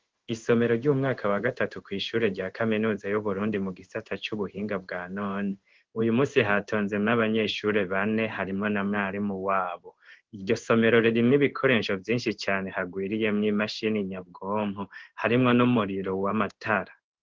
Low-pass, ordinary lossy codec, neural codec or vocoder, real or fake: 7.2 kHz; Opus, 16 kbps; codec, 16 kHz in and 24 kHz out, 1 kbps, XY-Tokenizer; fake